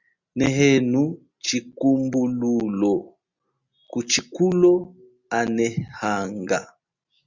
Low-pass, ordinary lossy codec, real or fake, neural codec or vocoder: 7.2 kHz; Opus, 64 kbps; real; none